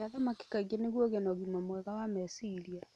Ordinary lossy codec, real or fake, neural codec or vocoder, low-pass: none; real; none; none